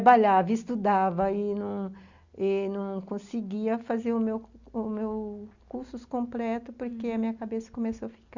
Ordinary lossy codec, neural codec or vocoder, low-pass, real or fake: Opus, 64 kbps; none; 7.2 kHz; real